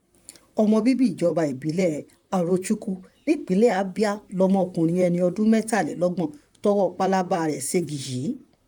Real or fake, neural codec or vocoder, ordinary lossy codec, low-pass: fake; vocoder, 44.1 kHz, 128 mel bands, Pupu-Vocoder; none; 19.8 kHz